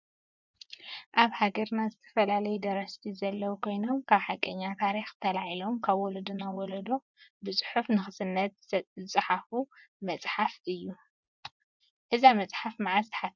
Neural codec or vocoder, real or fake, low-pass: vocoder, 24 kHz, 100 mel bands, Vocos; fake; 7.2 kHz